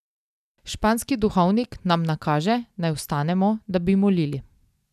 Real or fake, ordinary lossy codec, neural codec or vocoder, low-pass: real; none; none; 14.4 kHz